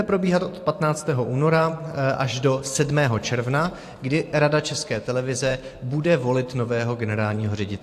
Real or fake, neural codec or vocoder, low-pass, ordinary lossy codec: fake; vocoder, 44.1 kHz, 128 mel bands every 512 samples, BigVGAN v2; 14.4 kHz; AAC, 64 kbps